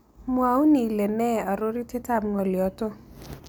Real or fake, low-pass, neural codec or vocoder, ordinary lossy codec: real; none; none; none